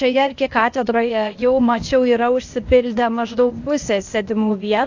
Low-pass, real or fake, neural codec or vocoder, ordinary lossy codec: 7.2 kHz; fake; codec, 16 kHz, 0.8 kbps, ZipCodec; AAC, 48 kbps